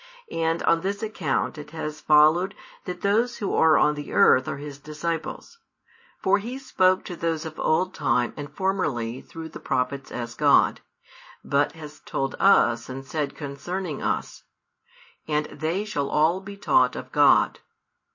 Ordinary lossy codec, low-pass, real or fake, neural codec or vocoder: MP3, 32 kbps; 7.2 kHz; real; none